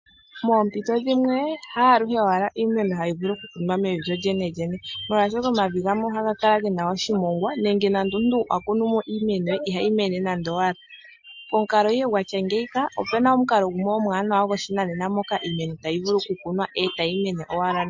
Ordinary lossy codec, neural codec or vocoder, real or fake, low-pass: MP3, 48 kbps; none; real; 7.2 kHz